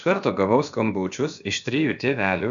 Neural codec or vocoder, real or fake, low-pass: codec, 16 kHz, about 1 kbps, DyCAST, with the encoder's durations; fake; 7.2 kHz